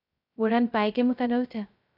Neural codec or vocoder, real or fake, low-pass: codec, 16 kHz, 0.2 kbps, FocalCodec; fake; 5.4 kHz